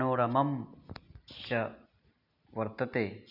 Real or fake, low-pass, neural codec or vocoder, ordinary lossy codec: real; 5.4 kHz; none; none